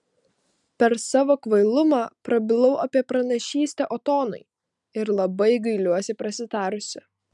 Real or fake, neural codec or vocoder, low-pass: real; none; 10.8 kHz